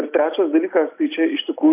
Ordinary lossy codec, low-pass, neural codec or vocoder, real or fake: AAC, 24 kbps; 3.6 kHz; none; real